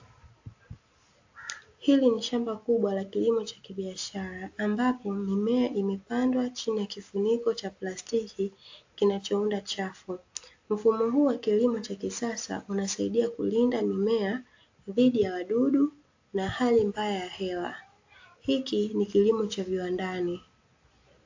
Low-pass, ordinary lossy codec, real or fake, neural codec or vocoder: 7.2 kHz; AAC, 48 kbps; real; none